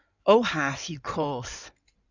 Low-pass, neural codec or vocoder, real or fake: 7.2 kHz; codec, 16 kHz in and 24 kHz out, 2.2 kbps, FireRedTTS-2 codec; fake